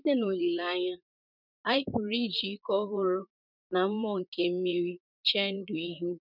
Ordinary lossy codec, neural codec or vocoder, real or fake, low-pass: none; vocoder, 44.1 kHz, 128 mel bands, Pupu-Vocoder; fake; 5.4 kHz